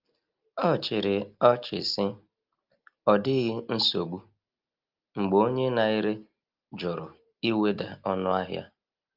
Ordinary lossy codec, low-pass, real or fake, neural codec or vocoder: Opus, 32 kbps; 5.4 kHz; real; none